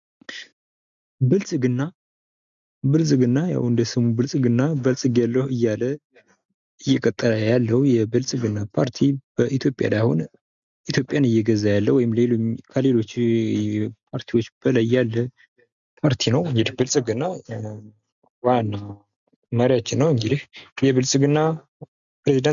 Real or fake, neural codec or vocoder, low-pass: real; none; 7.2 kHz